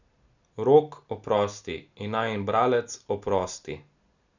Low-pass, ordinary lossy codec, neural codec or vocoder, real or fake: 7.2 kHz; none; none; real